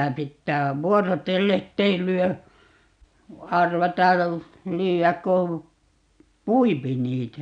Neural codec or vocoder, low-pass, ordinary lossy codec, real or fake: none; 9.9 kHz; none; real